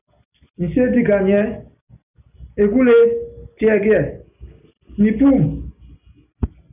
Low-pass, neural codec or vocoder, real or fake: 3.6 kHz; none; real